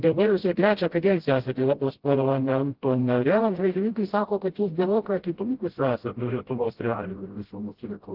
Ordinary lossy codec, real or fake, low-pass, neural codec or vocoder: Opus, 16 kbps; fake; 5.4 kHz; codec, 16 kHz, 0.5 kbps, FreqCodec, smaller model